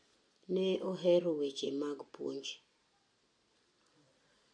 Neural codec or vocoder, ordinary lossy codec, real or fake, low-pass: none; MP3, 48 kbps; real; 9.9 kHz